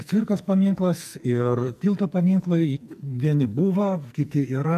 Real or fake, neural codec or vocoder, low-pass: fake; codec, 44.1 kHz, 2.6 kbps, SNAC; 14.4 kHz